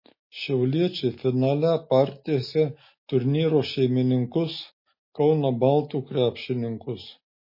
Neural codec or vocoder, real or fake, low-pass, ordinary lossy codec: none; real; 5.4 kHz; MP3, 24 kbps